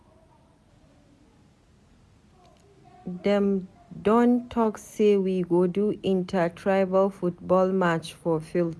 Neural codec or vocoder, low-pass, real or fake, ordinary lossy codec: none; none; real; none